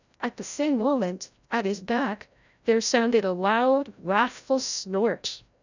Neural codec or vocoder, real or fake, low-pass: codec, 16 kHz, 0.5 kbps, FreqCodec, larger model; fake; 7.2 kHz